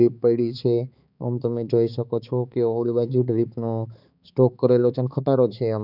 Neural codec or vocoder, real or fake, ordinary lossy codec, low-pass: codec, 16 kHz, 4 kbps, X-Codec, HuBERT features, trained on balanced general audio; fake; none; 5.4 kHz